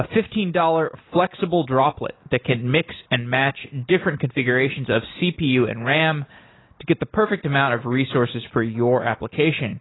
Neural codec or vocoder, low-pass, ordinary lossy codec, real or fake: none; 7.2 kHz; AAC, 16 kbps; real